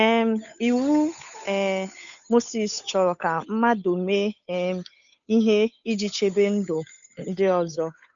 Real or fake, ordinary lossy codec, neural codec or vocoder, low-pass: fake; none; codec, 16 kHz, 8 kbps, FunCodec, trained on Chinese and English, 25 frames a second; 7.2 kHz